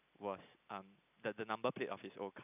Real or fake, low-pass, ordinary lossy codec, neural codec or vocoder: real; 3.6 kHz; none; none